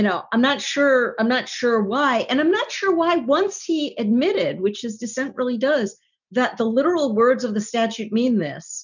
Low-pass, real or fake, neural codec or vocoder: 7.2 kHz; real; none